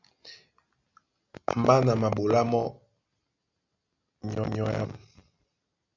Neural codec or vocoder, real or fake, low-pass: none; real; 7.2 kHz